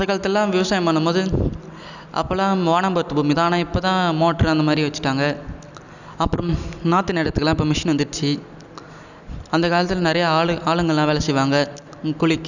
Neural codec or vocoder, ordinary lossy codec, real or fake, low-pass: none; none; real; 7.2 kHz